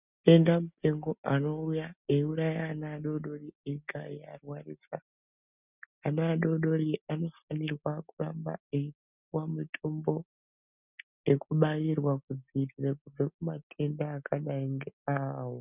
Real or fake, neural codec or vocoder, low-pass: real; none; 3.6 kHz